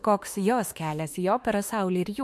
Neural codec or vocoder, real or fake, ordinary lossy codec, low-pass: autoencoder, 48 kHz, 32 numbers a frame, DAC-VAE, trained on Japanese speech; fake; MP3, 64 kbps; 14.4 kHz